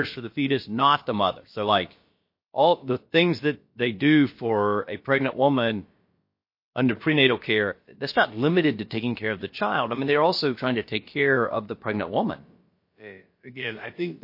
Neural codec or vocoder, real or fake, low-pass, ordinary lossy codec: codec, 16 kHz, about 1 kbps, DyCAST, with the encoder's durations; fake; 5.4 kHz; MP3, 32 kbps